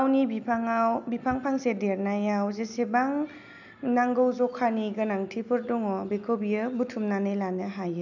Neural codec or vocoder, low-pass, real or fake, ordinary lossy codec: none; 7.2 kHz; real; none